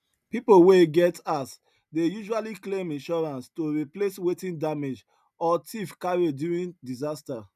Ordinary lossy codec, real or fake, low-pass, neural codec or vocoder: none; real; 14.4 kHz; none